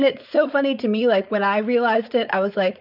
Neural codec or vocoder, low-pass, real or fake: codec, 16 kHz, 4.8 kbps, FACodec; 5.4 kHz; fake